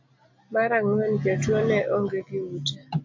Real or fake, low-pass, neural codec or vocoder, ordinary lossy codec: real; 7.2 kHz; none; AAC, 48 kbps